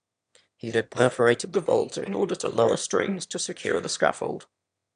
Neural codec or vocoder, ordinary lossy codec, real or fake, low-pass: autoencoder, 22.05 kHz, a latent of 192 numbers a frame, VITS, trained on one speaker; none; fake; 9.9 kHz